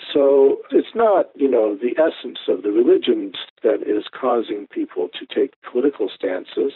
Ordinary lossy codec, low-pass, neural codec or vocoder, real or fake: AAC, 48 kbps; 5.4 kHz; vocoder, 44.1 kHz, 128 mel bands, Pupu-Vocoder; fake